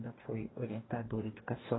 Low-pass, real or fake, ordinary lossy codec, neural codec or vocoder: 7.2 kHz; fake; AAC, 16 kbps; codec, 44.1 kHz, 2.6 kbps, DAC